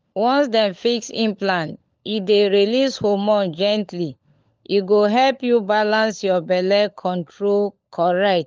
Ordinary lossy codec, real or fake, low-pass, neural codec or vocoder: Opus, 24 kbps; fake; 7.2 kHz; codec, 16 kHz, 16 kbps, FunCodec, trained on LibriTTS, 50 frames a second